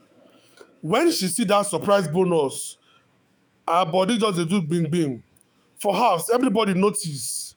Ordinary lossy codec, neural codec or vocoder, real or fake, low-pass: none; autoencoder, 48 kHz, 128 numbers a frame, DAC-VAE, trained on Japanese speech; fake; none